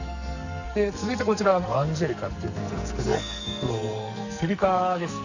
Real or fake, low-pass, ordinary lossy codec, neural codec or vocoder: fake; 7.2 kHz; Opus, 64 kbps; codec, 44.1 kHz, 2.6 kbps, SNAC